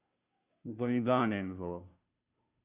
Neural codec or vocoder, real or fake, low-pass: codec, 16 kHz, 0.5 kbps, FunCodec, trained on Chinese and English, 25 frames a second; fake; 3.6 kHz